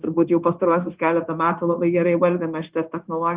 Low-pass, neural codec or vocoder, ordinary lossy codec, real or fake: 3.6 kHz; codec, 16 kHz, 0.9 kbps, LongCat-Audio-Codec; Opus, 32 kbps; fake